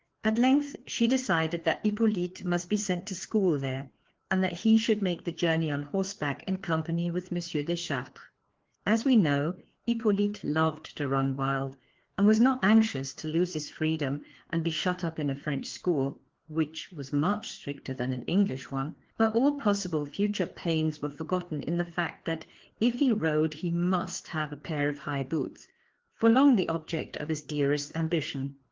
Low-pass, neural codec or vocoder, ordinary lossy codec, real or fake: 7.2 kHz; codec, 16 kHz, 2 kbps, FreqCodec, larger model; Opus, 16 kbps; fake